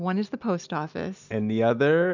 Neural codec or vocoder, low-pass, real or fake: none; 7.2 kHz; real